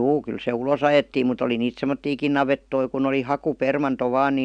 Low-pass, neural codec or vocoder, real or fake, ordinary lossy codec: 9.9 kHz; none; real; none